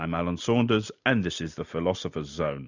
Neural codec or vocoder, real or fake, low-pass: none; real; 7.2 kHz